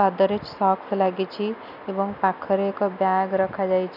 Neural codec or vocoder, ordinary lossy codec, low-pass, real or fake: none; none; 5.4 kHz; real